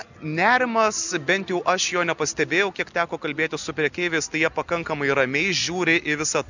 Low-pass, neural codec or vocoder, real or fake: 7.2 kHz; none; real